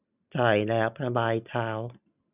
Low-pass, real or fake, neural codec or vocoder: 3.6 kHz; real; none